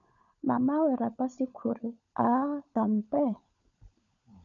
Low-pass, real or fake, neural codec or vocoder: 7.2 kHz; fake; codec, 16 kHz, 16 kbps, FunCodec, trained on LibriTTS, 50 frames a second